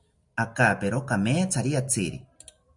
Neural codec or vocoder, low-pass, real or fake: none; 10.8 kHz; real